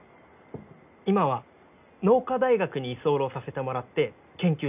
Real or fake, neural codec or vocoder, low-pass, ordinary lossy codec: real; none; 3.6 kHz; none